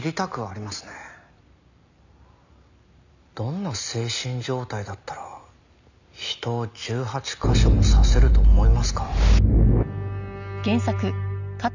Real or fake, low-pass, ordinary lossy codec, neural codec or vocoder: real; 7.2 kHz; none; none